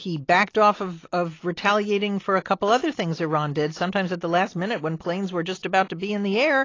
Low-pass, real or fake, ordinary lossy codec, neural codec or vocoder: 7.2 kHz; real; AAC, 32 kbps; none